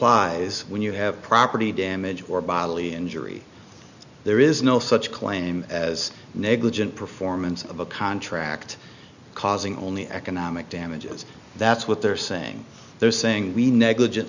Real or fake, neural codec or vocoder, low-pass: real; none; 7.2 kHz